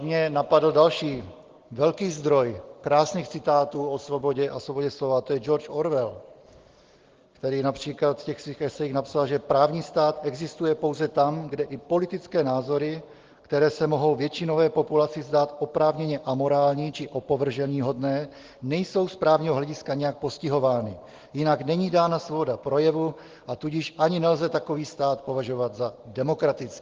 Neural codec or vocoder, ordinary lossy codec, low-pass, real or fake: none; Opus, 16 kbps; 7.2 kHz; real